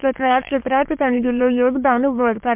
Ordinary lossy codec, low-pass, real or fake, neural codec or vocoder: MP3, 32 kbps; 3.6 kHz; fake; autoencoder, 22.05 kHz, a latent of 192 numbers a frame, VITS, trained on many speakers